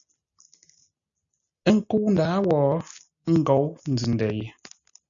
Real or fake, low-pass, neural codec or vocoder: real; 7.2 kHz; none